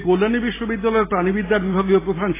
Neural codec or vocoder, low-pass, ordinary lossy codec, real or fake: none; 3.6 kHz; AAC, 16 kbps; real